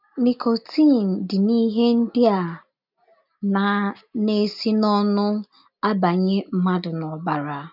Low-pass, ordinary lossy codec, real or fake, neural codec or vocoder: 5.4 kHz; none; real; none